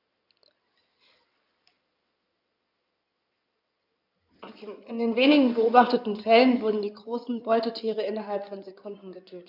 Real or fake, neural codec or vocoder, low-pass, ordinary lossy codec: fake; codec, 16 kHz in and 24 kHz out, 2.2 kbps, FireRedTTS-2 codec; 5.4 kHz; none